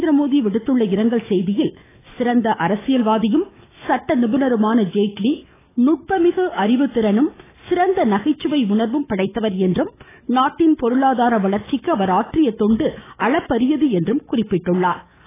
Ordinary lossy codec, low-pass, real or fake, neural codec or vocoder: AAC, 16 kbps; 3.6 kHz; fake; autoencoder, 48 kHz, 128 numbers a frame, DAC-VAE, trained on Japanese speech